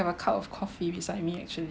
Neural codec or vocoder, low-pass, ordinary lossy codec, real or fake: none; none; none; real